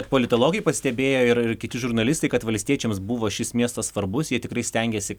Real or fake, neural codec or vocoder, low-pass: real; none; 19.8 kHz